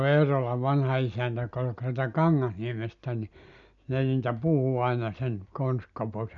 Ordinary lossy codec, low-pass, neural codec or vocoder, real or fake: none; 7.2 kHz; none; real